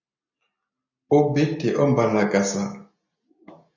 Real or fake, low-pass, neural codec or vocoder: real; 7.2 kHz; none